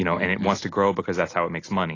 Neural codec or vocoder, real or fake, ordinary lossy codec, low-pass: none; real; AAC, 32 kbps; 7.2 kHz